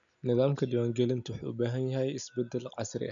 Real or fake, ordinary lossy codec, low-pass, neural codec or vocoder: real; none; 7.2 kHz; none